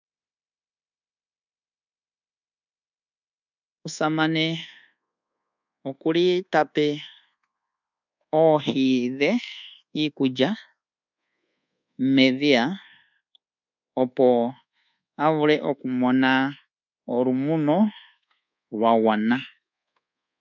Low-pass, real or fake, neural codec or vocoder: 7.2 kHz; fake; codec, 24 kHz, 1.2 kbps, DualCodec